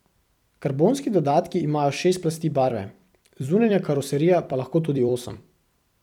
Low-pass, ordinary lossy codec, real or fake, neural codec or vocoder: 19.8 kHz; none; fake; vocoder, 44.1 kHz, 128 mel bands every 256 samples, BigVGAN v2